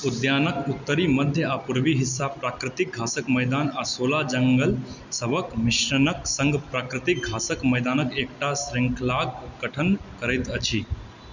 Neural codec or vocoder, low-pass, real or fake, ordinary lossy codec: none; 7.2 kHz; real; none